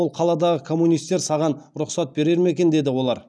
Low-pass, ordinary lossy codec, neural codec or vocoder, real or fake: none; none; none; real